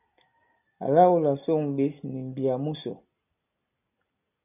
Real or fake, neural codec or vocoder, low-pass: real; none; 3.6 kHz